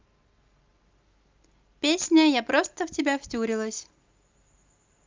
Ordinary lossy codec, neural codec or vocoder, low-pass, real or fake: Opus, 24 kbps; none; 7.2 kHz; real